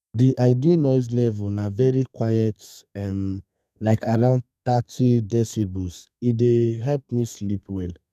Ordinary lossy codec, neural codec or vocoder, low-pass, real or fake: none; codec, 32 kHz, 1.9 kbps, SNAC; 14.4 kHz; fake